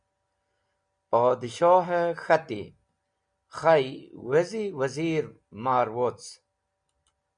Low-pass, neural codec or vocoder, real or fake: 9.9 kHz; none; real